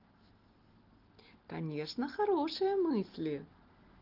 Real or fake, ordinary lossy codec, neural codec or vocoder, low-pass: real; Opus, 16 kbps; none; 5.4 kHz